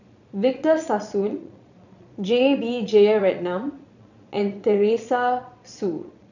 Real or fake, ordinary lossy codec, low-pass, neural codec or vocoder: fake; none; 7.2 kHz; vocoder, 22.05 kHz, 80 mel bands, Vocos